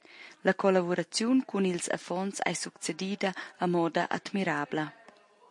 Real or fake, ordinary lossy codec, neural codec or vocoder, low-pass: real; MP3, 48 kbps; none; 10.8 kHz